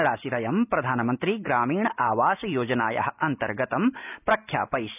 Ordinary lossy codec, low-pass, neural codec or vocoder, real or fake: none; 3.6 kHz; none; real